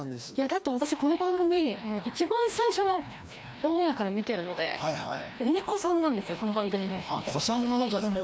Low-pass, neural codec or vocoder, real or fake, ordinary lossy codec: none; codec, 16 kHz, 1 kbps, FreqCodec, larger model; fake; none